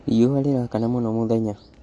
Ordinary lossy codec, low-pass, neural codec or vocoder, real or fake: MP3, 48 kbps; 10.8 kHz; none; real